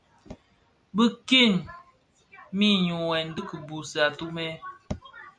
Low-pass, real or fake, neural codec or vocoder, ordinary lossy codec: 9.9 kHz; real; none; MP3, 64 kbps